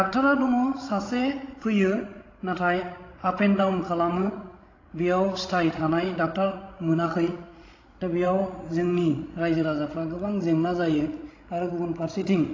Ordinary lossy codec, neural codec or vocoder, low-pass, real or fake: AAC, 32 kbps; codec, 16 kHz, 16 kbps, FreqCodec, larger model; 7.2 kHz; fake